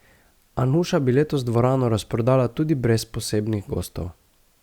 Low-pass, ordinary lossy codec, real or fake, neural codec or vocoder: 19.8 kHz; Opus, 64 kbps; real; none